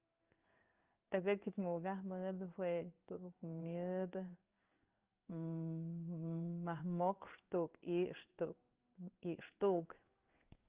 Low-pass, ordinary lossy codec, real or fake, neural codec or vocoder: 3.6 kHz; Opus, 32 kbps; fake; codec, 16 kHz in and 24 kHz out, 1 kbps, XY-Tokenizer